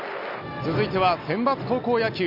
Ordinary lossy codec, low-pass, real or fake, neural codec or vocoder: none; 5.4 kHz; real; none